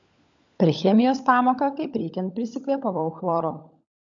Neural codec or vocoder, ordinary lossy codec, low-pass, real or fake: codec, 16 kHz, 16 kbps, FunCodec, trained on LibriTTS, 50 frames a second; AAC, 64 kbps; 7.2 kHz; fake